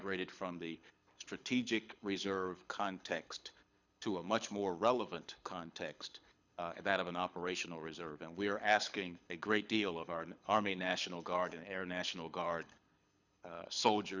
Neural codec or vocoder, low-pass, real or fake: codec, 24 kHz, 6 kbps, HILCodec; 7.2 kHz; fake